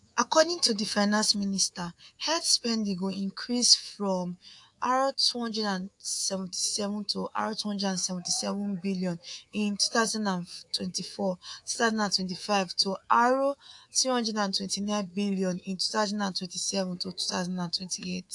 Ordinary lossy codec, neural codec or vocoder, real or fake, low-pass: AAC, 64 kbps; codec, 24 kHz, 3.1 kbps, DualCodec; fake; 10.8 kHz